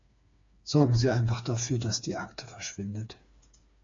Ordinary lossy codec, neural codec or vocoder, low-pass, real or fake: AAC, 48 kbps; codec, 16 kHz, 4 kbps, FreqCodec, smaller model; 7.2 kHz; fake